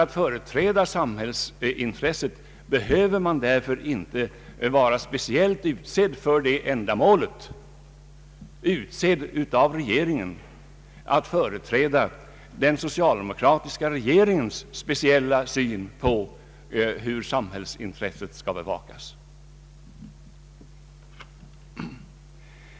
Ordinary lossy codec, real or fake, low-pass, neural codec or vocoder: none; real; none; none